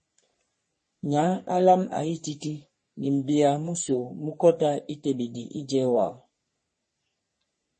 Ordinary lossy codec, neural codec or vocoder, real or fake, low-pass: MP3, 32 kbps; codec, 44.1 kHz, 3.4 kbps, Pupu-Codec; fake; 10.8 kHz